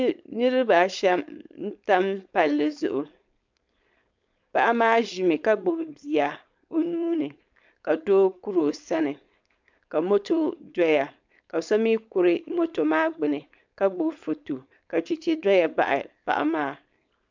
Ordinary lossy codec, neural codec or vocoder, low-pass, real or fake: MP3, 64 kbps; codec, 16 kHz, 4.8 kbps, FACodec; 7.2 kHz; fake